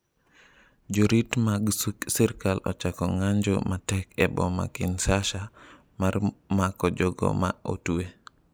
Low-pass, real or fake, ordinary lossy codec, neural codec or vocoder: none; real; none; none